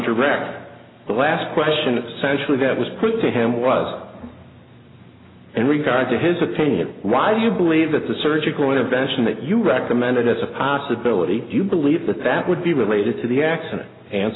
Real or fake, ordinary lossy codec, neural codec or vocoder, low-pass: real; AAC, 16 kbps; none; 7.2 kHz